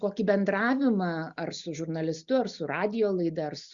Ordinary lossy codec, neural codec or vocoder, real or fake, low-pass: Opus, 64 kbps; none; real; 7.2 kHz